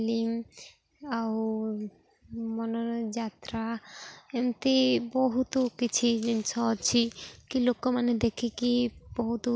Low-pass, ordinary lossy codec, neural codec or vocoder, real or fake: none; none; none; real